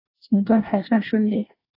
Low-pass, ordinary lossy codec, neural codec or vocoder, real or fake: 5.4 kHz; AAC, 24 kbps; codec, 24 kHz, 1 kbps, SNAC; fake